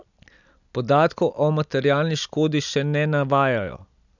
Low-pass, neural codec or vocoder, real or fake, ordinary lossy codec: 7.2 kHz; none; real; none